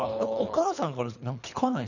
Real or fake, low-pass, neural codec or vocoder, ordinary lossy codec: fake; 7.2 kHz; codec, 24 kHz, 3 kbps, HILCodec; none